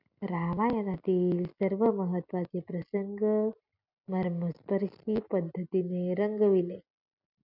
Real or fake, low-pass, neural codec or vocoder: real; 5.4 kHz; none